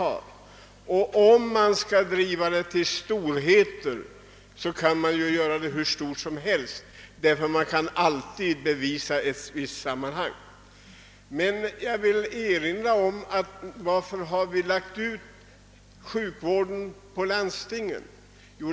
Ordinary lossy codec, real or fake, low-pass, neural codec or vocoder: none; real; none; none